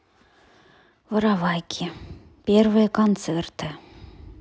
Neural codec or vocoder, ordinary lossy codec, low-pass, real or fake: none; none; none; real